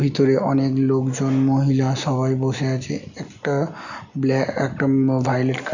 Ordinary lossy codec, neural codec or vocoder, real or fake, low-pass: none; none; real; 7.2 kHz